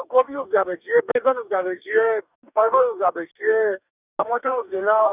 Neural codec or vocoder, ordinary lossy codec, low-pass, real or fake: codec, 44.1 kHz, 2.6 kbps, DAC; none; 3.6 kHz; fake